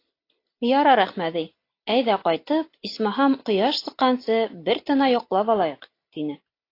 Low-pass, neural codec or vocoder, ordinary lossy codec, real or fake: 5.4 kHz; none; AAC, 32 kbps; real